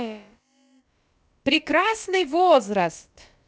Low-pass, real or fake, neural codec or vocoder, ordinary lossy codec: none; fake; codec, 16 kHz, about 1 kbps, DyCAST, with the encoder's durations; none